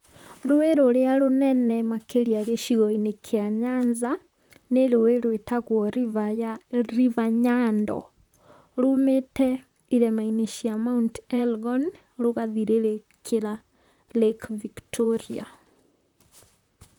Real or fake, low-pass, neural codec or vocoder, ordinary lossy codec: fake; 19.8 kHz; vocoder, 44.1 kHz, 128 mel bands, Pupu-Vocoder; none